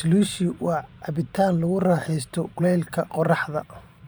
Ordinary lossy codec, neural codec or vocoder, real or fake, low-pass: none; vocoder, 44.1 kHz, 128 mel bands every 512 samples, BigVGAN v2; fake; none